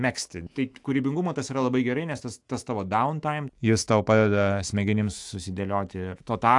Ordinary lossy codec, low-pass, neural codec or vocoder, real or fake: AAC, 64 kbps; 10.8 kHz; autoencoder, 48 kHz, 128 numbers a frame, DAC-VAE, trained on Japanese speech; fake